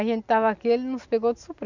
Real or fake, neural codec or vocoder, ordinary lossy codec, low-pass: real; none; none; 7.2 kHz